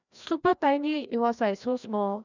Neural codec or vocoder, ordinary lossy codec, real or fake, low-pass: codec, 16 kHz, 1 kbps, FreqCodec, larger model; none; fake; 7.2 kHz